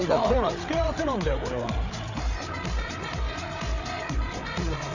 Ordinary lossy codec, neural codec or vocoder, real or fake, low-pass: none; codec, 16 kHz, 8 kbps, FreqCodec, larger model; fake; 7.2 kHz